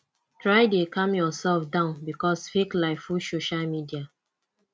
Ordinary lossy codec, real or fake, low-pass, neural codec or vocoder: none; real; none; none